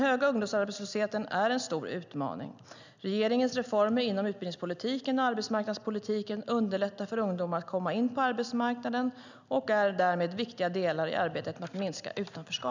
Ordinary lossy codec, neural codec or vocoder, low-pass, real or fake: none; none; 7.2 kHz; real